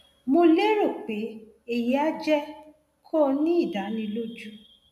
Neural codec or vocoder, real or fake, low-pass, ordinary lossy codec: none; real; 14.4 kHz; MP3, 96 kbps